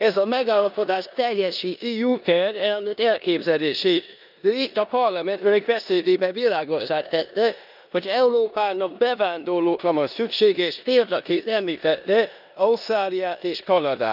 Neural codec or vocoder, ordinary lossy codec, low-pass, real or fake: codec, 16 kHz in and 24 kHz out, 0.9 kbps, LongCat-Audio-Codec, four codebook decoder; none; 5.4 kHz; fake